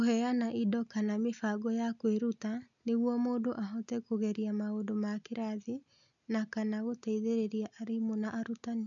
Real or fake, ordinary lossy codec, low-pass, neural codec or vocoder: real; none; 7.2 kHz; none